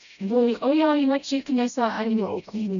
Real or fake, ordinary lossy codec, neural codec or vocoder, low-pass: fake; none; codec, 16 kHz, 0.5 kbps, FreqCodec, smaller model; 7.2 kHz